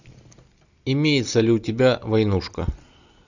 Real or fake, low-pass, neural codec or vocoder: real; 7.2 kHz; none